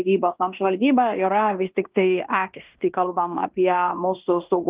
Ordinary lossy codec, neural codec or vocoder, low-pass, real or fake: Opus, 32 kbps; codec, 16 kHz in and 24 kHz out, 0.9 kbps, LongCat-Audio-Codec, fine tuned four codebook decoder; 3.6 kHz; fake